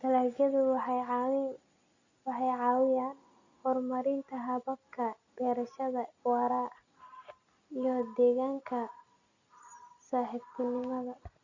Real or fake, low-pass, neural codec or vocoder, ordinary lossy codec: real; 7.2 kHz; none; Opus, 64 kbps